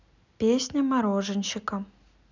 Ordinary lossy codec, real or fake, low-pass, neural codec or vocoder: none; real; 7.2 kHz; none